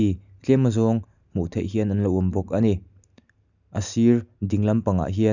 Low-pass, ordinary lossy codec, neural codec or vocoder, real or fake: 7.2 kHz; none; none; real